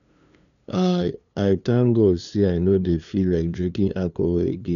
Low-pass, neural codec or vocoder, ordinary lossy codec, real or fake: 7.2 kHz; codec, 16 kHz, 2 kbps, FunCodec, trained on LibriTTS, 25 frames a second; none; fake